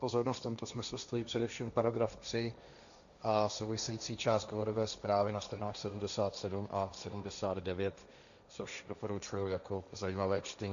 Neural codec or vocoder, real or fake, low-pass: codec, 16 kHz, 1.1 kbps, Voila-Tokenizer; fake; 7.2 kHz